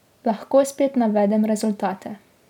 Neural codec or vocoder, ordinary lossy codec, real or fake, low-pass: none; none; real; 19.8 kHz